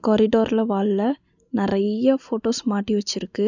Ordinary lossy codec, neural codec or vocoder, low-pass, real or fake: none; none; 7.2 kHz; real